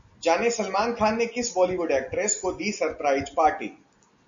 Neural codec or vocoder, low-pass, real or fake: none; 7.2 kHz; real